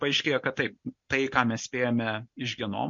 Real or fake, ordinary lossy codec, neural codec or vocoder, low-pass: real; MP3, 48 kbps; none; 7.2 kHz